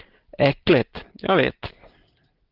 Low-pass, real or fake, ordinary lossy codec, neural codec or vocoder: 5.4 kHz; real; Opus, 16 kbps; none